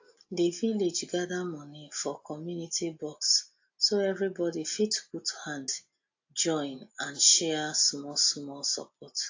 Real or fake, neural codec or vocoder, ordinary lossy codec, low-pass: real; none; AAC, 48 kbps; 7.2 kHz